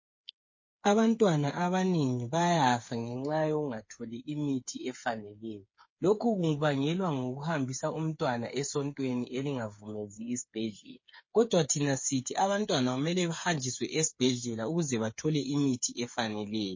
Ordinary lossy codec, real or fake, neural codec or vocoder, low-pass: MP3, 32 kbps; fake; codec, 16 kHz, 8 kbps, FreqCodec, smaller model; 7.2 kHz